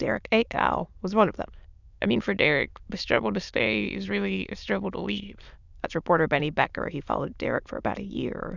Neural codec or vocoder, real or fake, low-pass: autoencoder, 22.05 kHz, a latent of 192 numbers a frame, VITS, trained on many speakers; fake; 7.2 kHz